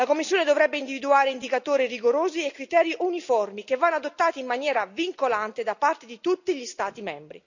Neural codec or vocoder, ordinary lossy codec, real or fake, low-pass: none; none; real; 7.2 kHz